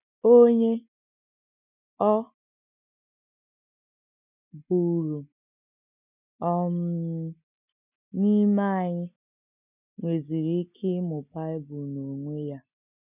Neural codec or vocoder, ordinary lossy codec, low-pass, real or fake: none; none; 3.6 kHz; real